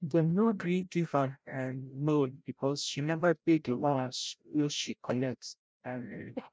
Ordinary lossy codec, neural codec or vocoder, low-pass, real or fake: none; codec, 16 kHz, 0.5 kbps, FreqCodec, larger model; none; fake